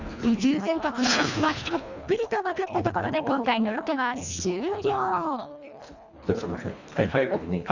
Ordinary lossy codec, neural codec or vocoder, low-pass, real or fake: none; codec, 24 kHz, 1.5 kbps, HILCodec; 7.2 kHz; fake